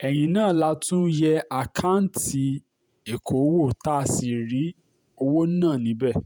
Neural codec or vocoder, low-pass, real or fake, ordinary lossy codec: vocoder, 48 kHz, 128 mel bands, Vocos; none; fake; none